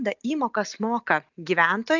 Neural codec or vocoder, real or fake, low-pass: none; real; 7.2 kHz